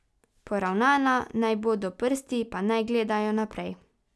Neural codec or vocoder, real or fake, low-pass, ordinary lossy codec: none; real; none; none